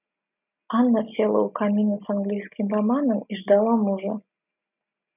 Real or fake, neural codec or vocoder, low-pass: real; none; 3.6 kHz